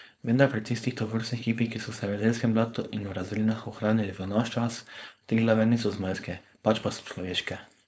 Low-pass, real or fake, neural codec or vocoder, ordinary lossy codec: none; fake; codec, 16 kHz, 4.8 kbps, FACodec; none